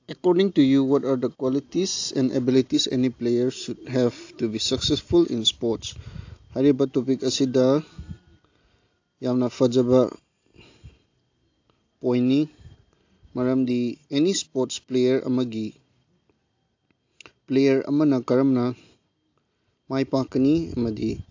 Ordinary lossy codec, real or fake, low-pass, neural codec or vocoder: AAC, 48 kbps; real; 7.2 kHz; none